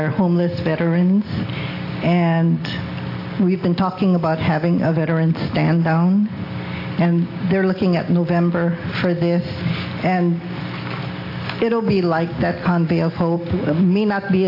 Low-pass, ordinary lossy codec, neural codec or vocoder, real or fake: 5.4 kHz; AAC, 32 kbps; none; real